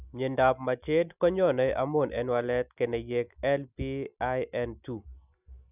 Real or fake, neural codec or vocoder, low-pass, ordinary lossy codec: real; none; 3.6 kHz; none